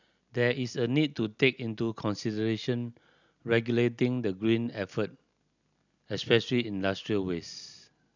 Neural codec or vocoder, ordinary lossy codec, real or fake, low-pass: none; none; real; 7.2 kHz